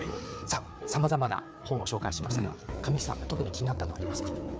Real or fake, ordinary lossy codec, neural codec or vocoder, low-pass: fake; none; codec, 16 kHz, 4 kbps, FreqCodec, larger model; none